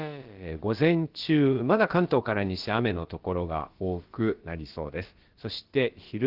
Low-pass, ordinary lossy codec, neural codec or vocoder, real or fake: 5.4 kHz; Opus, 16 kbps; codec, 16 kHz, about 1 kbps, DyCAST, with the encoder's durations; fake